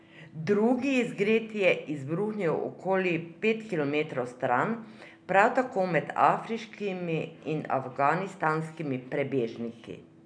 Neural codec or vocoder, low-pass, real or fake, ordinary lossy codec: none; 9.9 kHz; real; none